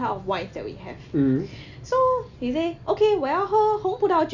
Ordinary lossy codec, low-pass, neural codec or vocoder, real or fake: none; 7.2 kHz; none; real